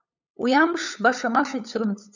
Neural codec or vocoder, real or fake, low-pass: codec, 16 kHz, 8 kbps, FunCodec, trained on LibriTTS, 25 frames a second; fake; 7.2 kHz